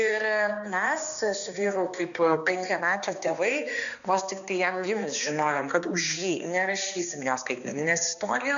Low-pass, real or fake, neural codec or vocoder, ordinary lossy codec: 7.2 kHz; fake; codec, 16 kHz, 2 kbps, X-Codec, HuBERT features, trained on balanced general audio; MP3, 96 kbps